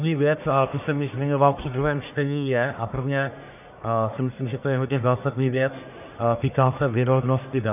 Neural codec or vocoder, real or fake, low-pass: codec, 44.1 kHz, 1.7 kbps, Pupu-Codec; fake; 3.6 kHz